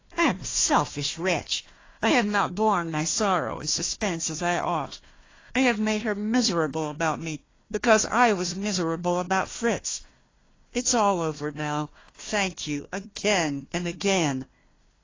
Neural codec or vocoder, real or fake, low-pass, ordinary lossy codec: codec, 16 kHz, 1 kbps, FunCodec, trained on Chinese and English, 50 frames a second; fake; 7.2 kHz; AAC, 32 kbps